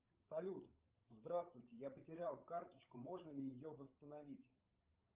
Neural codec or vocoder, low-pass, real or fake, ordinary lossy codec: codec, 16 kHz, 8 kbps, FreqCodec, larger model; 3.6 kHz; fake; Opus, 24 kbps